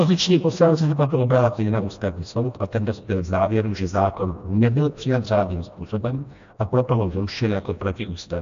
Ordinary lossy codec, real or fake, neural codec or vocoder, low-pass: AAC, 64 kbps; fake; codec, 16 kHz, 1 kbps, FreqCodec, smaller model; 7.2 kHz